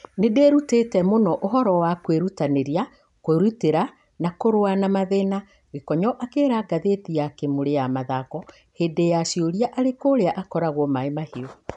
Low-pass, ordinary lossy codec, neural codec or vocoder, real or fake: 10.8 kHz; none; none; real